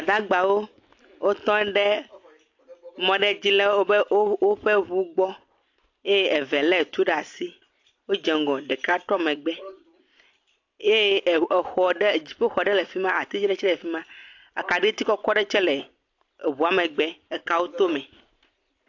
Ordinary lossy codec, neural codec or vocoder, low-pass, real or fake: AAC, 48 kbps; none; 7.2 kHz; real